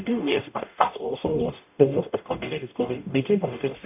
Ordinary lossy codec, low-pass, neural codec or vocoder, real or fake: AAC, 32 kbps; 3.6 kHz; codec, 44.1 kHz, 0.9 kbps, DAC; fake